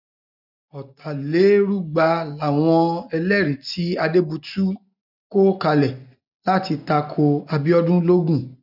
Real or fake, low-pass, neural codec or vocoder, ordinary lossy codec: real; 5.4 kHz; none; none